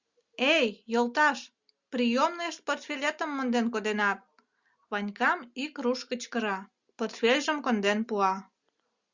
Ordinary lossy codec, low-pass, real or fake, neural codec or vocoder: Opus, 64 kbps; 7.2 kHz; real; none